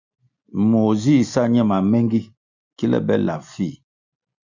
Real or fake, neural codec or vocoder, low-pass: real; none; 7.2 kHz